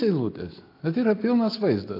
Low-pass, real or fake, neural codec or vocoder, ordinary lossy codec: 5.4 kHz; real; none; AAC, 32 kbps